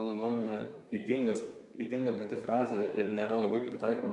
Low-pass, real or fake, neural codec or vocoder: 10.8 kHz; fake; codec, 24 kHz, 1 kbps, SNAC